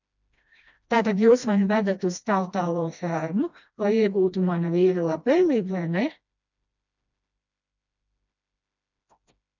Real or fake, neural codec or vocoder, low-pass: fake; codec, 16 kHz, 1 kbps, FreqCodec, smaller model; 7.2 kHz